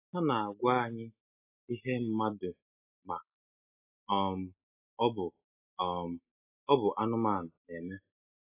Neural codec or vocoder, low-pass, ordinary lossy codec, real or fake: none; 3.6 kHz; AAC, 24 kbps; real